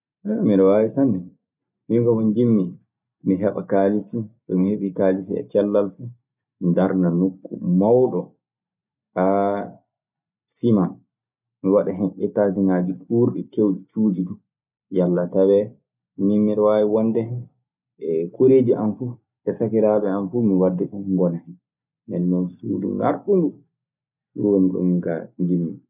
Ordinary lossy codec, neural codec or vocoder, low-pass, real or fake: none; none; 3.6 kHz; real